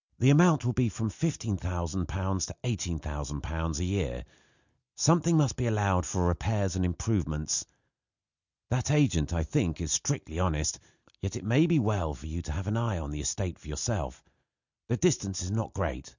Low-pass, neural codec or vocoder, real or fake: 7.2 kHz; none; real